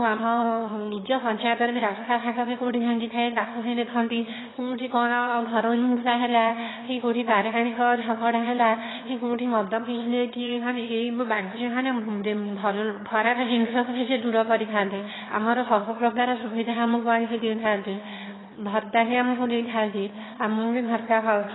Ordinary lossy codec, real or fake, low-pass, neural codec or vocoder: AAC, 16 kbps; fake; 7.2 kHz; autoencoder, 22.05 kHz, a latent of 192 numbers a frame, VITS, trained on one speaker